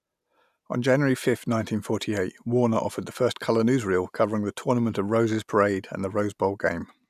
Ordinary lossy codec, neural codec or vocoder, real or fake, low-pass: none; none; real; 14.4 kHz